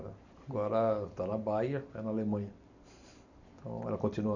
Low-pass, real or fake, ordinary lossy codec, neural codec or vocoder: 7.2 kHz; real; none; none